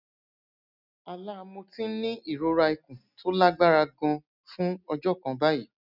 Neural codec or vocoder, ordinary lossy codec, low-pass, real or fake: none; none; 5.4 kHz; real